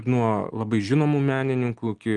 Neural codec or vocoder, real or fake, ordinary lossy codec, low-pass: none; real; Opus, 32 kbps; 10.8 kHz